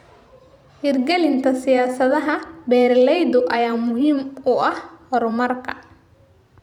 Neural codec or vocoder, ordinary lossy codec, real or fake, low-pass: vocoder, 44.1 kHz, 128 mel bands every 512 samples, BigVGAN v2; none; fake; 19.8 kHz